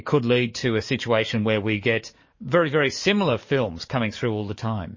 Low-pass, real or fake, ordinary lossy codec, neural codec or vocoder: 7.2 kHz; fake; MP3, 32 kbps; codec, 44.1 kHz, 7.8 kbps, DAC